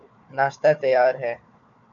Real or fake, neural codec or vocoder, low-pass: fake; codec, 16 kHz, 4 kbps, FunCodec, trained on Chinese and English, 50 frames a second; 7.2 kHz